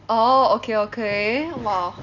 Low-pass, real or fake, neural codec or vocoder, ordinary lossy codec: 7.2 kHz; real; none; none